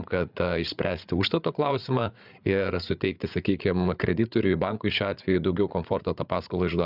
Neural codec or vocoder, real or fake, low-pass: codec, 16 kHz, 8 kbps, FreqCodec, larger model; fake; 5.4 kHz